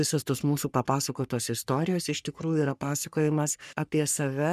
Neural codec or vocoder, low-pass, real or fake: codec, 44.1 kHz, 3.4 kbps, Pupu-Codec; 14.4 kHz; fake